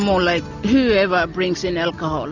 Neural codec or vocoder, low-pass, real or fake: none; 7.2 kHz; real